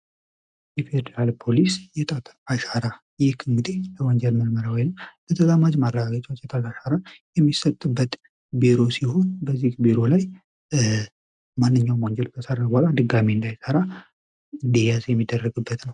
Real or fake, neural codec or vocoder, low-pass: real; none; 10.8 kHz